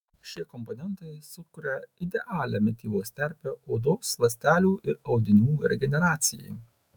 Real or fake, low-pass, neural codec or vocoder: fake; 19.8 kHz; autoencoder, 48 kHz, 128 numbers a frame, DAC-VAE, trained on Japanese speech